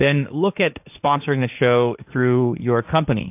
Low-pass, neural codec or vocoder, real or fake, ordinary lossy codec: 3.6 kHz; codec, 16 kHz, 1.1 kbps, Voila-Tokenizer; fake; AAC, 32 kbps